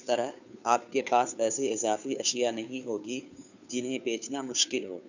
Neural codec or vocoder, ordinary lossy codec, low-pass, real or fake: codec, 16 kHz, 2 kbps, FunCodec, trained on Chinese and English, 25 frames a second; none; 7.2 kHz; fake